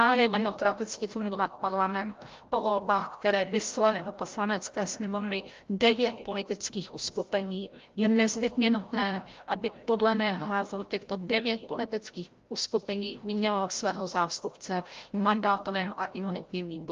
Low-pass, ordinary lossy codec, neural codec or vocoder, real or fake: 7.2 kHz; Opus, 32 kbps; codec, 16 kHz, 0.5 kbps, FreqCodec, larger model; fake